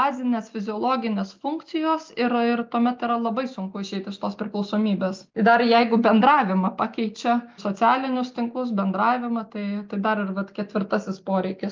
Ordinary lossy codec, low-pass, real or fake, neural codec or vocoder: Opus, 24 kbps; 7.2 kHz; real; none